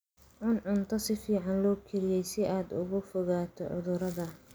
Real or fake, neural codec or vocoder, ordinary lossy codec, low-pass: real; none; none; none